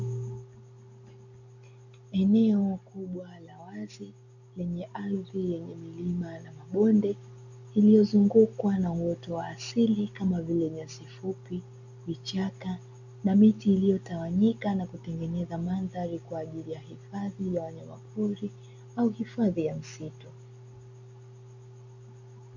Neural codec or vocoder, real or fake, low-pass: none; real; 7.2 kHz